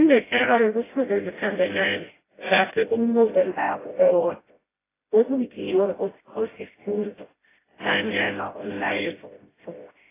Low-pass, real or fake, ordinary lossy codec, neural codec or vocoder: 3.6 kHz; fake; AAC, 16 kbps; codec, 16 kHz, 0.5 kbps, FreqCodec, smaller model